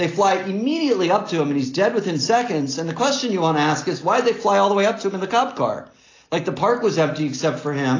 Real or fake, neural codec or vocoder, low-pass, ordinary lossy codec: real; none; 7.2 kHz; AAC, 32 kbps